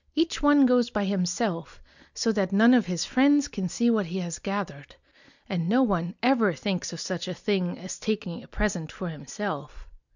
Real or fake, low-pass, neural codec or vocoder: real; 7.2 kHz; none